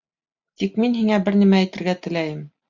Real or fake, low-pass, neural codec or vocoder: real; 7.2 kHz; none